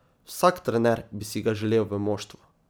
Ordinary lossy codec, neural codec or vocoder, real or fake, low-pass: none; none; real; none